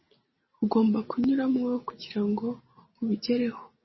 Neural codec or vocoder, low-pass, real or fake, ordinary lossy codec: none; 7.2 kHz; real; MP3, 24 kbps